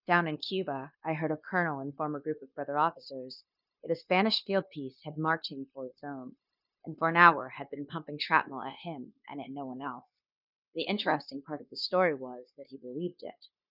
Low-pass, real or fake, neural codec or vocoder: 5.4 kHz; fake; codec, 16 kHz, 0.9 kbps, LongCat-Audio-Codec